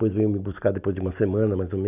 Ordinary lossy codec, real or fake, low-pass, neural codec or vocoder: none; real; 3.6 kHz; none